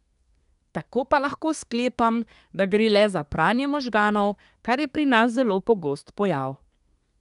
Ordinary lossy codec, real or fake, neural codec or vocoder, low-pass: none; fake; codec, 24 kHz, 1 kbps, SNAC; 10.8 kHz